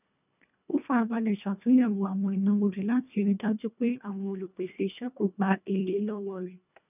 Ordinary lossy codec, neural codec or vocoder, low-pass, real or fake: none; codec, 24 kHz, 1.5 kbps, HILCodec; 3.6 kHz; fake